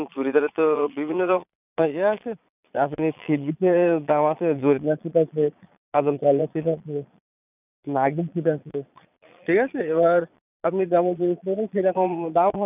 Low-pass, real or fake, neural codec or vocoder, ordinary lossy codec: 3.6 kHz; fake; vocoder, 22.05 kHz, 80 mel bands, Vocos; none